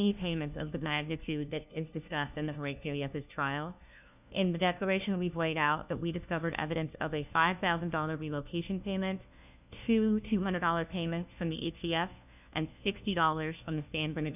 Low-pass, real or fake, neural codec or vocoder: 3.6 kHz; fake; codec, 16 kHz, 1 kbps, FunCodec, trained on LibriTTS, 50 frames a second